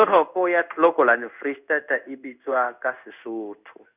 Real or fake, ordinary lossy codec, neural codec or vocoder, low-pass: fake; none; codec, 16 kHz in and 24 kHz out, 1 kbps, XY-Tokenizer; 3.6 kHz